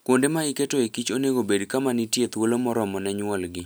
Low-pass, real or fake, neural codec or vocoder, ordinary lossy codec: none; real; none; none